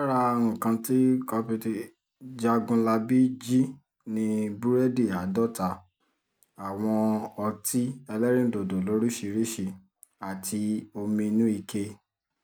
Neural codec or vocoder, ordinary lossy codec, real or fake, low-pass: none; none; real; none